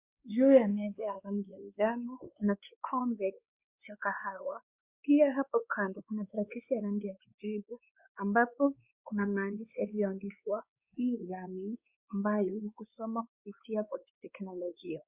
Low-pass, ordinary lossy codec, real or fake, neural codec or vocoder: 3.6 kHz; Opus, 64 kbps; fake; codec, 16 kHz, 2 kbps, X-Codec, WavLM features, trained on Multilingual LibriSpeech